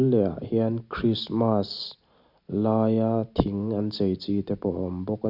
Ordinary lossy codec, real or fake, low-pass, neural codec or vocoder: none; real; 5.4 kHz; none